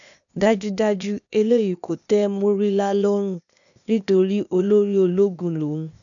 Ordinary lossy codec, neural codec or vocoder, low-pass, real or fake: none; codec, 16 kHz, 0.8 kbps, ZipCodec; 7.2 kHz; fake